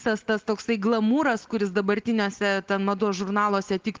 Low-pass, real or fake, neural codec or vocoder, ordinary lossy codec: 7.2 kHz; real; none; Opus, 16 kbps